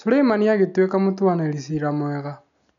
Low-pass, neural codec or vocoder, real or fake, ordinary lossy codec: 7.2 kHz; none; real; MP3, 96 kbps